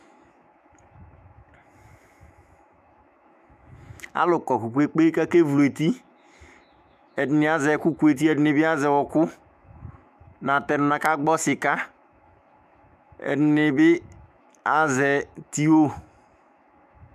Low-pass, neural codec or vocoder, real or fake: 14.4 kHz; autoencoder, 48 kHz, 128 numbers a frame, DAC-VAE, trained on Japanese speech; fake